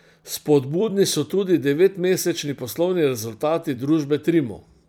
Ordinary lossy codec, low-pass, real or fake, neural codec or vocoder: none; none; fake; vocoder, 44.1 kHz, 128 mel bands every 256 samples, BigVGAN v2